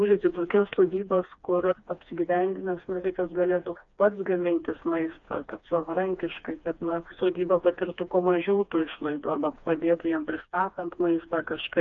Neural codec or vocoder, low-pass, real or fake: codec, 16 kHz, 2 kbps, FreqCodec, smaller model; 7.2 kHz; fake